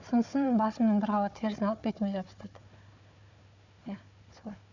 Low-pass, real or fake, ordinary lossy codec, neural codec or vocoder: 7.2 kHz; fake; none; codec, 16 kHz, 4 kbps, FunCodec, trained on Chinese and English, 50 frames a second